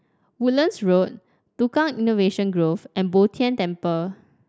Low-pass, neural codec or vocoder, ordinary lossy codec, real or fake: none; none; none; real